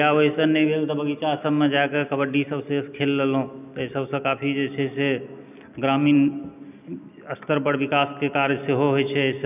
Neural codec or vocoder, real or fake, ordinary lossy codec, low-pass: none; real; none; 3.6 kHz